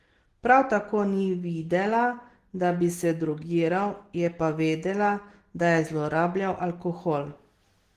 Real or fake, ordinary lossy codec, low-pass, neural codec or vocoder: real; Opus, 16 kbps; 14.4 kHz; none